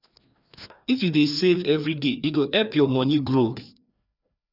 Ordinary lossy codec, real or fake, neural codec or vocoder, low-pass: none; fake; codec, 16 kHz, 2 kbps, FreqCodec, larger model; 5.4 kHz